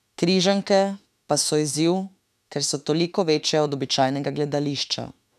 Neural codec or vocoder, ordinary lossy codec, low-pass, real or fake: autoencoder, 48 kHz, 32 numbers a frame, DAC-VAE, trained on Japanese speech; none; 14.4 kHz; fake